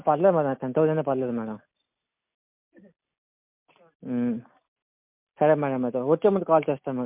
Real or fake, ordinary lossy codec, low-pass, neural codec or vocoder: real; MP3, 32 kbps; 3.6 kHz; none